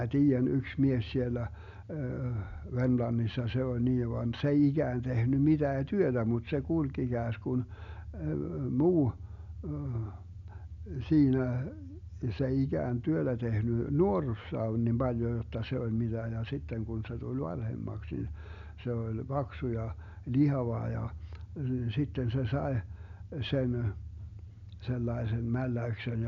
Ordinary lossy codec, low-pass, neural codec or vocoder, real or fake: none; 7.2 kHz; none; real